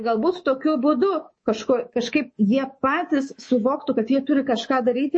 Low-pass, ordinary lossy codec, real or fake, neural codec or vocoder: 9.9 kHz; MP3, 32 kbps; fake; codec, 24 kHz, 3.1 kbps, DualCodec